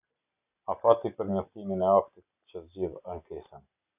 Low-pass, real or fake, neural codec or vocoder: 3.6 kHz; real; none